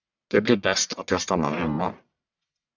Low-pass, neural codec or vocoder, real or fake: 7.2 kHz; codec, 44.1 kHz, 1.7 kbps, Pupu-Codec; fake